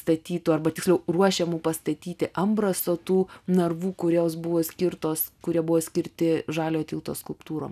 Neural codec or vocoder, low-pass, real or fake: none; 14.4 kHz; real